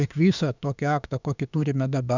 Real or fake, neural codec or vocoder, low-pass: fake; autoencoder, 48 kHz, 32 numbers a frame, DAC-VAE, trained on Japanese speech; 7.2 kHz